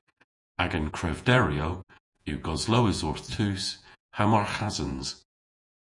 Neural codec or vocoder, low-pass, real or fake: vocoder, 48 kHz, 128 mel bands, Vocos; 10.8 kHz; fake